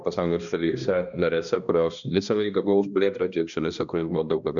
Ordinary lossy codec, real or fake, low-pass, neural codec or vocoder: MP3, 96 kbps; fake; 7.2 kHz; codec, 16 kHz, 1 kbps, X-Codec, HuBERT features, trained on balanced general audio